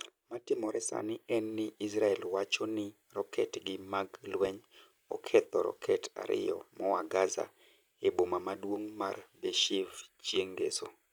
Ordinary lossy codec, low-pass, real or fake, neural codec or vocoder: none; none; real; none